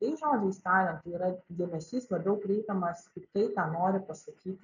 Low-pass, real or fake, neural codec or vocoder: 7.2 kHz; real; none